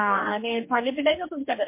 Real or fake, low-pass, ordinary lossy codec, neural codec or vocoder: fake; 3.6 kHz; MP3, 24 kbps; codec, 44.1 kHz, 2.6 kbps, DAC